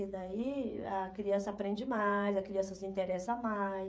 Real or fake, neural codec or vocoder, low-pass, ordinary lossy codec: fake; codec, 16 kHz, 16 kbps, FreqCodec, smaller model; none; none